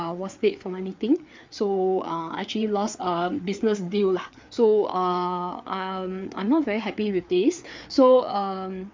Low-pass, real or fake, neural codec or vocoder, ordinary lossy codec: 7.2 kHz; fake; codec, 16 kHz, 4 kbps, FreqCodec, larger model; MP3, 64 kbps